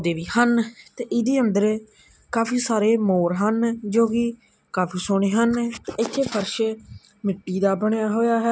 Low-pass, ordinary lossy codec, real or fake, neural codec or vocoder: none; none; real; none